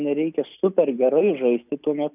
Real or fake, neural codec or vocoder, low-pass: real; none; 3.6 kHz